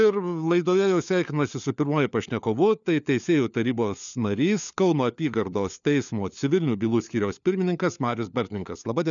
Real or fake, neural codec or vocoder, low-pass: fake; codec, 16 kHz, 4 kbps, FunCodec, trained on LibriTTS, 50 frames a second; 7.2 kHz